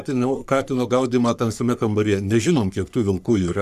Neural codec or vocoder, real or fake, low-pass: codec, 44.1 kHz, 3.4 kbps, Pupu-Codec; fake; 14.4 kHz